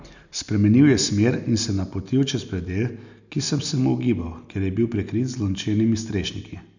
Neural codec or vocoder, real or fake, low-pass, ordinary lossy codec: none; real; 7.2 kHz; none